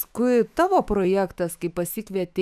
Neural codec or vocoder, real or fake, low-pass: autoencoder, 48 kHz, 128 numbers a frame, DAC-VAE, trained on Japanese speech; fake; 14.4 kHz